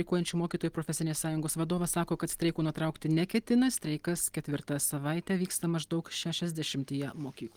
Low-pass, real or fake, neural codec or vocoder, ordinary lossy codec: 19.8 kHz; real; none; Opus, 24 kbps